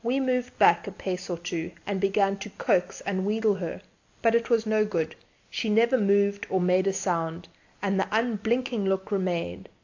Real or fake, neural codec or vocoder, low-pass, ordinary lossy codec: real; none; 7.2 kHz; AAC, 48 kbps